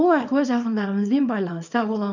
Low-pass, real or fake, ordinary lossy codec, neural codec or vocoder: 7.2 kHz; fake; none; codec, 24 kHz, 0.9 kbps, WavTokenizer, small release